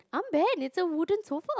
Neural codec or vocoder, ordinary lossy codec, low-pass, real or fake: none; none; none; real